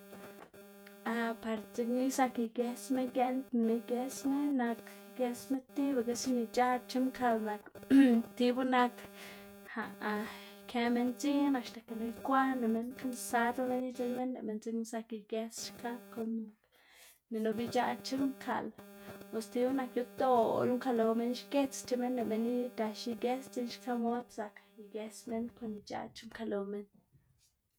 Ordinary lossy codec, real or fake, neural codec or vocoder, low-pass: none; fake; vocoder, 48 kHz, 128 mel bands, Vocos; none